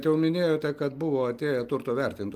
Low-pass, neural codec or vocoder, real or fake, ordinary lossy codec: 14.4 kHz; none; real; Opus, 32 kbps